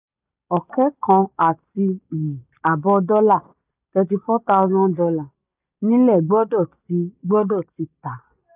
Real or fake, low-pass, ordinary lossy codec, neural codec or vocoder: real; 3.6 kHz; none; none